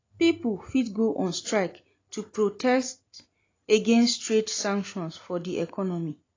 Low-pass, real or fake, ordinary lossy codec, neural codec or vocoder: 7.2 kHz; real; AAC, 32 kbps; none